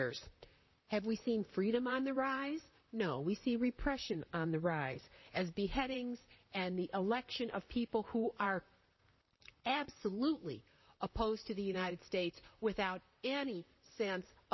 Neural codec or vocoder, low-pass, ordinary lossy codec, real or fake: vocoder, 44.1 kHz, 128 mel bands, Pupu-Vocoder; 7.2 kHz; MP3, 24 kbps; fake